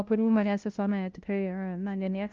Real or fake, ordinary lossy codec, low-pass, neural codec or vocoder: fake; Opus, 32 kbps; 7.2 kHz; codec, 16 kHz, 0.5 kbps, FunCodec, trained on LibriTTS, 25 frames a second